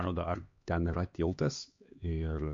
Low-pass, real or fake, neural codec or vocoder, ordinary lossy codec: 7.2 kHz; fake; codec, 16 kHz, 2 kbps, X-Codec, HuBERT features, trained on LibriSpeech; MP3, 48 kbps